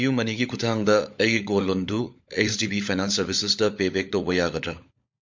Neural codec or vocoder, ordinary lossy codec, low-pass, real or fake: codec, 16 kHz, 16 kbps, FunCodec, trained on LibriTTS, 50 frames a second; MP3, 48 kbps; 7.2 kHz; fake